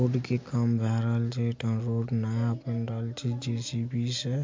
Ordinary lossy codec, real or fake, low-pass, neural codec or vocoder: MP3, 48 kbps; real; 7.2 kHz; none